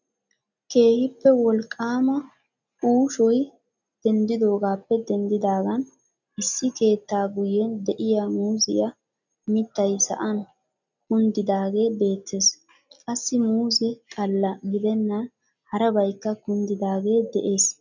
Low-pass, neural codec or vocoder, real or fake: 7.2 kHz; none; real